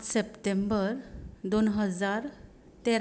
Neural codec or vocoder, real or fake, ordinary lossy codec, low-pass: none; real; none; none